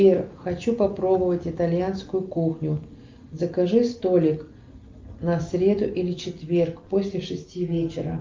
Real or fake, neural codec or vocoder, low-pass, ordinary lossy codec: real; none; 7.2 kHz; Opus, 32 kbps